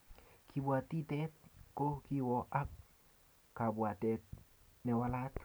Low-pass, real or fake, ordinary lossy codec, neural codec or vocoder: none; real; none; none